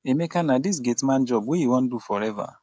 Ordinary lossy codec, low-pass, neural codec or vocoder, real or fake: none; none; codec, 16 kHz, 16 kbps, FreqCodec, smaller model; fake